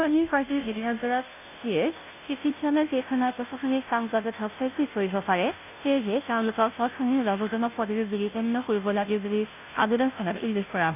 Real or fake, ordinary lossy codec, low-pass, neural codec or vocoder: fake; none; 3.6 kHz; codec, 16 kHz, 0.5 kbps, FunCodec, trained on Chinese and English, 25 frames a second